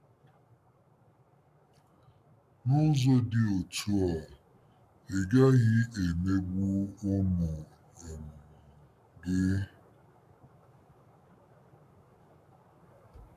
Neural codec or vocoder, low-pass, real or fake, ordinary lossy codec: codec, 44.1 kHz, 7.8 kbps, Pupu-Codec; 14.4 kHz; fake; none